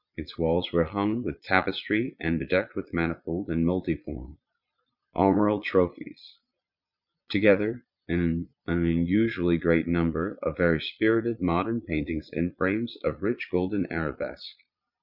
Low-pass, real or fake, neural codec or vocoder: 5.4 kHz; fake; vocoder, 22.05 kHz, 80 mel bands, Vocos